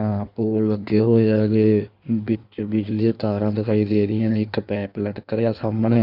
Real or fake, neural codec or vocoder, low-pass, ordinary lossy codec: fake; codec, 16 kHz in and 24 kHz out, 1.1 kbps, FireRedTTS-2 codec; 5.4 kHz; none